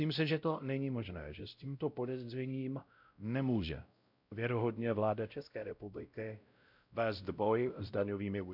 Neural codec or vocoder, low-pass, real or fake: codec, 16 kHz, 0.5 kbps, X-Codec, WavLM features, trained on Multilingual LibriSpeech; 5.4 kHz; fake